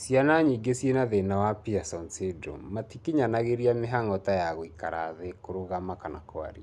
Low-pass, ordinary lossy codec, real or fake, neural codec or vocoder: none; none; real; none